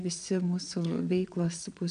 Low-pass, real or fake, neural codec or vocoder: 9.9 kHz; fake; vocoder, 22.05 kHz, 80 mel bands, Vocos